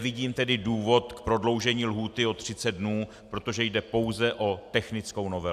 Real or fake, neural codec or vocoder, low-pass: real; none; 14.4 kHz